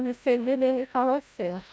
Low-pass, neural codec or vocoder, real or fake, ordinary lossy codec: none; codec, 16 kHz, 0.5 kbps, FreqCodec, larger model; fake; none